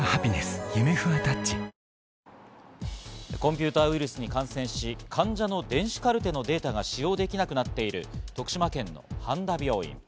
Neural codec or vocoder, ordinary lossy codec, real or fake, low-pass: none; none; real; none